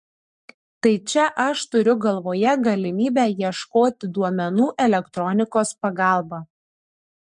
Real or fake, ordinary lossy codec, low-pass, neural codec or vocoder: fake; MP3, 64 kbps; 10.8 kHz; codec, 44.1 kHz, 7.8 kbps, Pupu-Codec